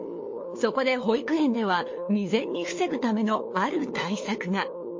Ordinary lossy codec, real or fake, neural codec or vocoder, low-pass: MP3, 32 kbps; fake; codec, 16 kHz, 4 kbps, FunCodec, trained on LibriTTS, 50 frames a second; 7.2 kHz